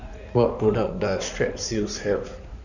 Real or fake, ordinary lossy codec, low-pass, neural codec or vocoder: fake; none; 7.2 kHz; codec, 16 kHz in and 24 kHz out, 2.2 kbps, FireRedTTS-2 codec